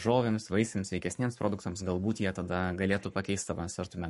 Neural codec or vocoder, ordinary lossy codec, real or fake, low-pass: codec, 44.1 kHz, 7.8 kbps, Pupu-Codec; MP3, 48 kbps; fake; 14.4 kHz